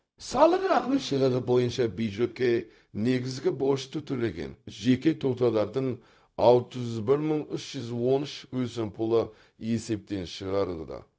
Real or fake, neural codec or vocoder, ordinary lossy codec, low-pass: fake; codec, 16 kHz, 0.4 kbps, LongCat-Audio-Codec; none; none